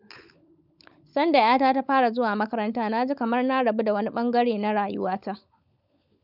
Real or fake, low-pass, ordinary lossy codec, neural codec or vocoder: fake; 5.4 kHz; none; codec, 16 kHz, 16 kbps, FunCodec, trained on LibriTTS, 50 frames a second